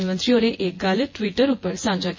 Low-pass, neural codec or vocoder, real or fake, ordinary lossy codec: 7.2 kHz; vocoder, 24 kHz, 100 mel bands, Vocos; fake; MP3, 32 kbps